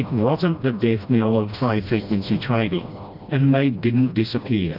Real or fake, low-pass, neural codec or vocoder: fake; 5.4 kHz; codec, 16 kHz, 1 kbps, FreqCodec, smaller model